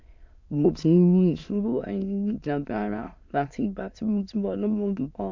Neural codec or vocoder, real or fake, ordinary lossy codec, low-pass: autoencoder, 22.05 kHz, a latent of 192 numbers a frame, VITS, trained on many speakers; fake; MP3, 64 kbps; 7.2 kHz